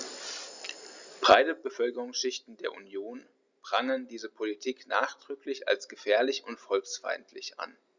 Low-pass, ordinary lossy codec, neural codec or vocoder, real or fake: 7.2 kHz; Opus, 64 kbps; none; real